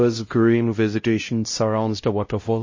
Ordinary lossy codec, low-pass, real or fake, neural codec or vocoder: MP3, 32 kbps; 7.2 kHz; fake; codec, 16 kHz, 0.5 kbps, X-Codec, HuBERT features, trained on LibriSpeech